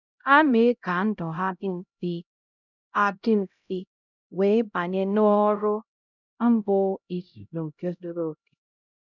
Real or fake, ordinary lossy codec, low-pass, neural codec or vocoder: fake; none; 7.2 kHz; codec, 16 kHz, 0.5 kbps, X-Codec, HuBERT features, trained on LibriSpeech